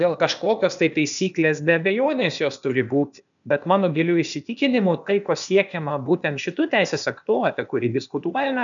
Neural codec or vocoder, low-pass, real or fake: codec, 16 kHz, 0.8 kbps, ZipCodec; 7.2 kHz; fake